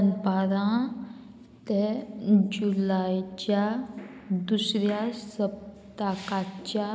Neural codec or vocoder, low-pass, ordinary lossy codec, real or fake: none; none; none; real